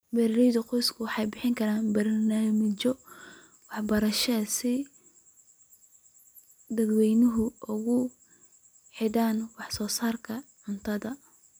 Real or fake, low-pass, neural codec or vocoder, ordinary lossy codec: real; none; none; none